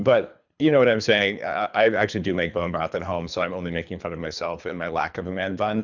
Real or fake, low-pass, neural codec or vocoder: fake; 7.2 kHz; codec, 24 kHz, 3 kbps, HILCodec